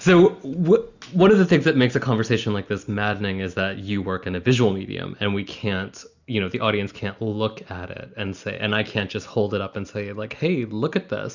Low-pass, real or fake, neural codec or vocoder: 7.2 kHz; real; none